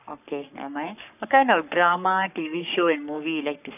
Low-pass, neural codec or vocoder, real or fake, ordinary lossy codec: 3.6 kHz; codec, 44.1 kHz, 3.4 kbps, Pupu-Codec; fake; none